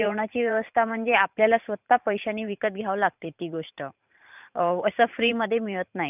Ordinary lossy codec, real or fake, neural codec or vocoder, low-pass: none; fake; vocoder, 44.1 kHz, 128 mel bands every 512 samples, BigVGAN v2; 3.6 kHz